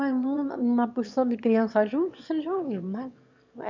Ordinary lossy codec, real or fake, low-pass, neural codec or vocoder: MP3, 64 kbps; fake; 7.2 kHz; autoencoder, 22.05 kHz, a latent of 192 numbers a frame, VITS, trained on one speaker